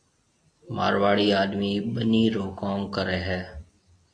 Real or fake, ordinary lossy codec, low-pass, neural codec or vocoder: real; AAC, 48 kbps; 9.9 kHz; none